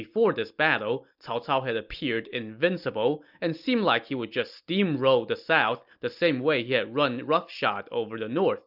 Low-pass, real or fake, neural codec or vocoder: 5.4 kHz; real; none